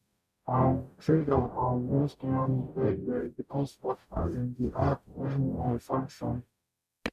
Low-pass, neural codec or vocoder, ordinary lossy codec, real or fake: 14.4 kHz; codec, 44.1 kHz, 0.9 kbps, DAC; none; fake